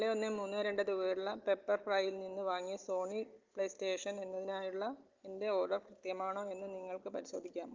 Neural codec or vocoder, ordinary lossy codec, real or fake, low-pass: none; Opus, 32 kbps; real; 7.2 kHz